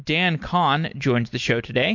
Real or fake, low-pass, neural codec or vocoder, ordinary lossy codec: real; 7.2 kHz; none; MP3, 48 kbps